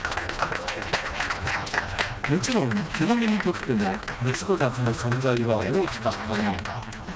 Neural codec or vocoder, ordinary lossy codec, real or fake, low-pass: codec, 16 kHz, 1 kbps, FreqCodec, smaller model; none; fake; none